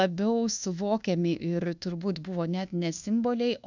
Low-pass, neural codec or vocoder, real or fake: 7.2 kHz; codec, 24 kHz, 1.2 kbps, DualCodec; fake